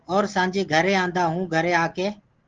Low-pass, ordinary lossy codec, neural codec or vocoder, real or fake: 7.2 kHz; Opus, 16 kbps; none; real